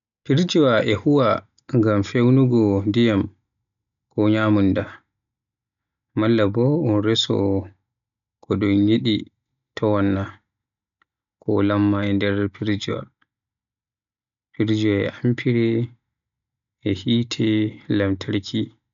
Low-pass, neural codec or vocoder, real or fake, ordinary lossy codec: 7.2 kHz; none; real; MP3, 96 kbps